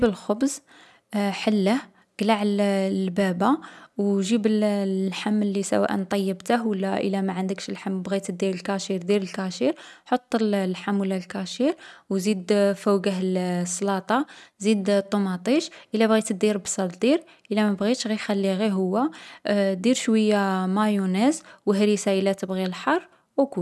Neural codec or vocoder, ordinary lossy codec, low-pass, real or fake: none; none; none; real